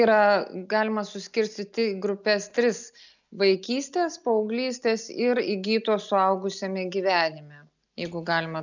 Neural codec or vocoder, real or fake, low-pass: none; real; 7.2 kHz